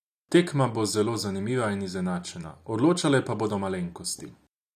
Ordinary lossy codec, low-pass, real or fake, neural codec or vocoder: none; 14.4 kHz; real; none